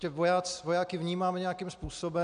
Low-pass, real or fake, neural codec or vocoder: 9.9 kHz; real; none